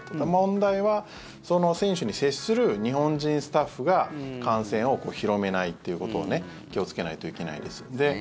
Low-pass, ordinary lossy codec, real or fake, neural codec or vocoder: none; none; real; none